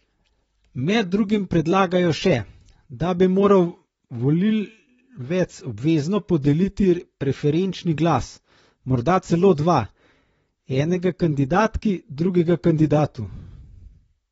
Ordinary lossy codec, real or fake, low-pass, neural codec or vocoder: AAC, 24 kbps; fake; 19.8 kHz; vocoder, 44.1 kHz, 128 mel bands, Pupu-Vocoder